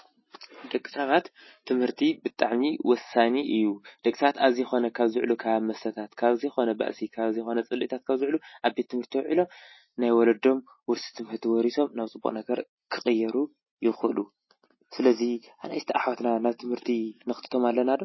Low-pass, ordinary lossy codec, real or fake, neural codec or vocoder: 7.2 kHz; MP3, 24 kbps; real; none